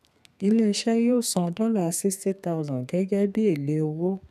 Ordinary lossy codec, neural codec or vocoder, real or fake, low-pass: none; codec, 32 kHz, 1.9 kbps, SNAC; fake; 14.4 kHz